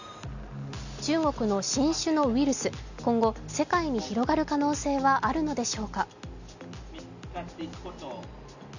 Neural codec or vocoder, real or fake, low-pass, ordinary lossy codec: none; real; 7.2 kHz; none